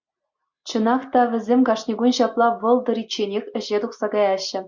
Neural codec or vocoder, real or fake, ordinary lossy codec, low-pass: none; real; MP3, 64 kbps; 7.2 kHz